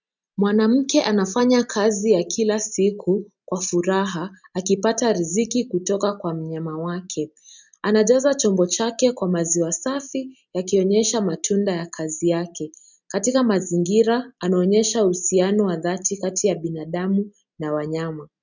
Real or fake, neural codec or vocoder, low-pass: real; none; 7.2 kHz